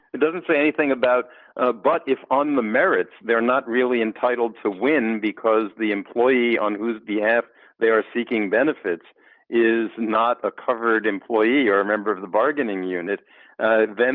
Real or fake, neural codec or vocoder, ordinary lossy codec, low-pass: real; none; Opus, 24 kbps; 5.4 kHz